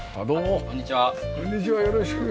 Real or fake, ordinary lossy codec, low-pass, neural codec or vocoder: real; none; none; none